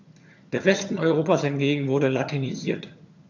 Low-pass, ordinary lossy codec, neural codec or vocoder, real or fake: 7.2 kHz; none; vocoder, 22.05 kHz, 80 mel bands, HiFi-GAN; fake